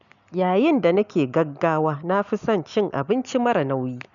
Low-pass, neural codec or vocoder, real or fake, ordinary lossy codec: 7.2 kHz; none; real; none